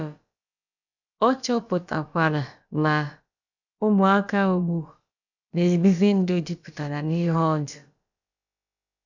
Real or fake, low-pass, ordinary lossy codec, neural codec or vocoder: fake; 7.2 kHz; none; codec, 16 kHz, about 1 kbps, DyCAST, with the encoder's durations